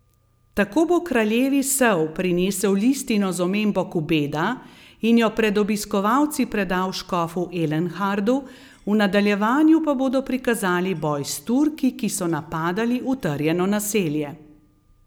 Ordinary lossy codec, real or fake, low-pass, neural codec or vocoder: none; real; none; none